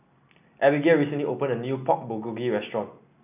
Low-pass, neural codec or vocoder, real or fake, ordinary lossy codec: 3.6 kHz; none; real; none